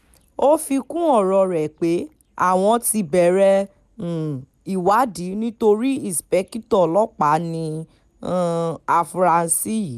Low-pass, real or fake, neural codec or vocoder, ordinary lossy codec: 14.4 kHz; real; none; none